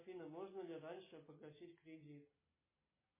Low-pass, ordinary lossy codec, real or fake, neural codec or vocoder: 3.6 kHz; MP3, 16 kbps; real; none